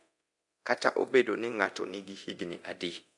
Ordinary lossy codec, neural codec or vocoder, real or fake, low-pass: none; codec, 24 kHz, 0.9 kbps, DualCodec; fake; 10.8 kHz